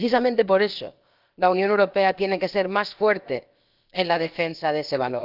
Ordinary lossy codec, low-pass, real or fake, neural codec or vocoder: Opus, 24 kbps; 5.4 kHz; fake; codec, 16 kHz, about 1 kbps, DyCAST, with the encoder's durations